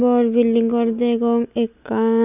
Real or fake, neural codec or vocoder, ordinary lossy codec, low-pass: real; none; none; 3.6 kHz